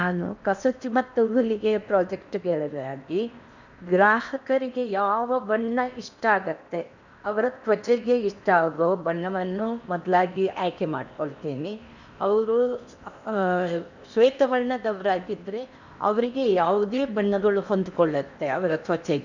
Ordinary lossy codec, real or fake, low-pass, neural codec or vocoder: none; fake; 7.2 kHz; codec, 16 kHz in and 24 kHz out, 0.8 kbps, FocalCodec, streaming, 65536 codes